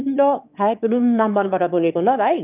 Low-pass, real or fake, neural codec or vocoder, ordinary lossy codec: 3.6 kHz; fake; autoencoder, 22.05 kHz, a latent of 192 numbers a frame, VITS, trained on one speaker; none